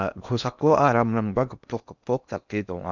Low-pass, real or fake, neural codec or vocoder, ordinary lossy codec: 7.2 kHz; fake; codec, 16 kHz in and 24 kHz out, 0.8 kbps, FocalCodec, streaming, 65536 codes; none